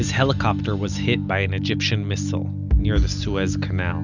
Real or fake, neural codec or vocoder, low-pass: real; none; 7.2 kHz